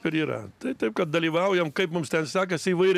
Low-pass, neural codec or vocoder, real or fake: 14.4 kHz; vocoder, 44.1 kHz, 128 mel bands every 512 samples, BigVGAN v2; fake